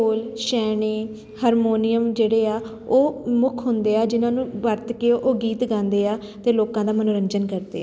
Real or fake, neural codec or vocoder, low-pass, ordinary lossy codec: real; none; none; none